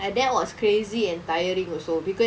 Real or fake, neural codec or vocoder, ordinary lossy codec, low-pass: real; none; none; none